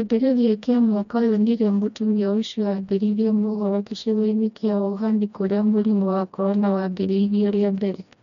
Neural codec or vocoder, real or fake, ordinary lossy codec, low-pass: codec, 16 kHz, 1 kbps, FreqCodec, smaller model; fake; none; 7.2 kHz